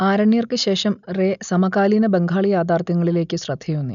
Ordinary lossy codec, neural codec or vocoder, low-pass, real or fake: none; none; 7.2 kHz; real